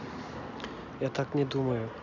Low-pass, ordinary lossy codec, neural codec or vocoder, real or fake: 7.2 kHz; none; none; real